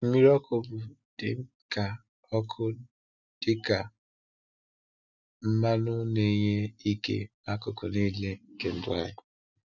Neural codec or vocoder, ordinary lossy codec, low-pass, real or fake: none; none; 7.2 kHz; real